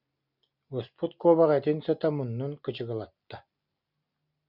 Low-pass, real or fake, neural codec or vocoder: 5.4 kHz; real; none